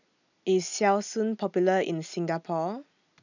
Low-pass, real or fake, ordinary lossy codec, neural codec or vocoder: 7.2 kHz; real; none; none